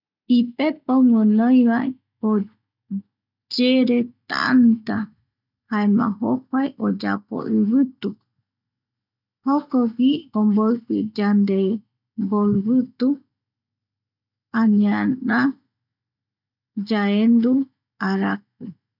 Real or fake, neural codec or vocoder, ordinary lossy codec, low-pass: real; none; none; 5.4 kHz